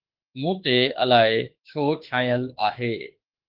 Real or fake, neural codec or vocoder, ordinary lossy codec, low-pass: fake; autoencoder, 48 kHz, 32 numbers a frame, DAC-VAE, trained on Japanese speech; Opus, 16 kbps; 5.4 kHz